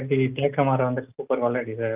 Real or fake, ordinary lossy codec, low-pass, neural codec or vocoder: real; Opus, 32 kbps; 3.6 kHz; none